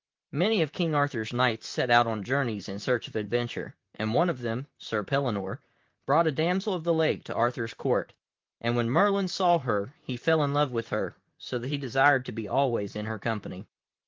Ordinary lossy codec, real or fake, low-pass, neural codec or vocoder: Opus, 32 kbps; fake; 7.2 kHz; vocoder, 44.1 kHz, 128 mel bands, Pupu-Vocoder